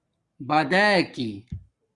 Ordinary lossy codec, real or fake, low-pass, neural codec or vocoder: Opus, 64 kbps; fake; 10.8 kHz; codec, 44.1 kHz, 7.8 kbps, Pupu-Codec